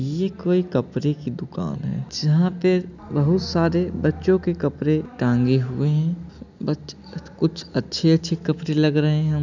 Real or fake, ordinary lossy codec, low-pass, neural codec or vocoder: real; none; 7.2 kHz; none